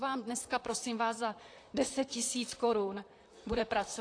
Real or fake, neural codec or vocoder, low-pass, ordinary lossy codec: fake; vocoder, 44.1 kHz, 128 mel bands, Pupu-Vocoder; 9.9 kHz; AAC, 48 kbps